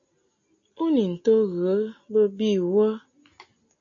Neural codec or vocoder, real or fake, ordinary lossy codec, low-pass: none; real; AAC, 64 kbps; 7.2 kHz